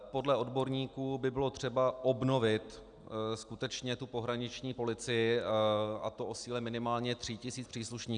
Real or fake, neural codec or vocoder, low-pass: real; none; 9.9 kHz